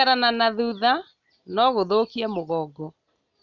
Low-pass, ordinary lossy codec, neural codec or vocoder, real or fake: 7.2 kHz; none; none; real